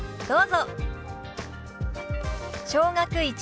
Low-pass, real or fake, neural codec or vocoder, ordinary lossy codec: none; real; none; none